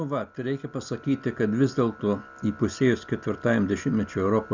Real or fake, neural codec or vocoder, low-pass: real; none; 7.2 kHz